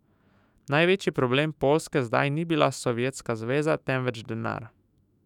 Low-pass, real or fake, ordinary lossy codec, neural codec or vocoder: 19.8 kHz; fake; none; autoencoder, 48 kHz, 128 numbers a frame, DAC-VAE, trained on Japanese speech